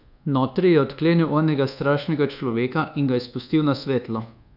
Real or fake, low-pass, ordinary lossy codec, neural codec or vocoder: fake; 5.4 kHz; none; codec, 24 kHz, 1.2 kbps, DualCodec